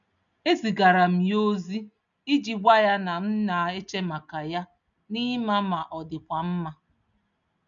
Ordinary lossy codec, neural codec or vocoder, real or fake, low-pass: none; none; real; 7.2 kHz